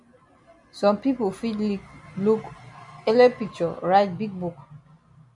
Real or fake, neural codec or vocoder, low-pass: real; none; 10.8 kHz